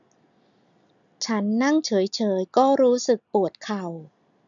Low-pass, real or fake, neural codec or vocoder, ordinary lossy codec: 7.2 kHz; real; none; MP3, 96 kbps